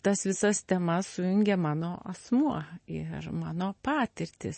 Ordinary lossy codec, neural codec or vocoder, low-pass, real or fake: MP3, 32 kbps; none; 10.8 kHz; real